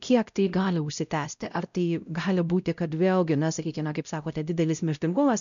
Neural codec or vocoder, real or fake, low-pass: codec, 16 kHz, 0.5 kbps, X-Codec, WavLM features, trained on Multilingual LibriSpeech; fake; 7.2 kHz